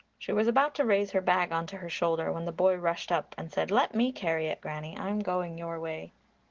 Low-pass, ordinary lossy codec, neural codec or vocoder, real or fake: 7.2 kHz; Opus, 16 kbps; none; real